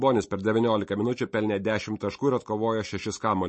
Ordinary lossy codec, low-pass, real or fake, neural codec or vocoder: MP3, 32 kbps; 10.8 kHz; real; none